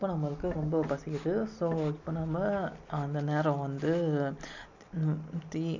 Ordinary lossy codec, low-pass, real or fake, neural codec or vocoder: none; 7.2 kHz; real; none